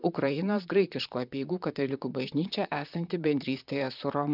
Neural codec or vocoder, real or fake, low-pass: vocoder, 44.1 kHz, 128 mel bands, Pupu-Vocoder; fake; 5.4 kHz